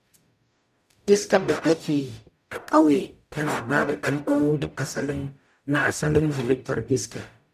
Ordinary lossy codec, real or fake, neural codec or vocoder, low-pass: none; fake; codec, 44.1 kHz, 0.9 kbps, DAC; 14.4 kHz